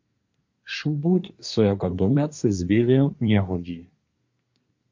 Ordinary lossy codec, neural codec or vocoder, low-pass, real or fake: MP3, 48 kbps; codec, 24 kHz, 1 kbps, SNAC; 7.2 kHz; fake